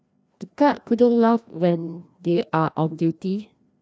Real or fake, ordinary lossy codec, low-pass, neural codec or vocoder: fake; none; none; codec, 16 kHz, 1 kbps, FreqCodec, larger model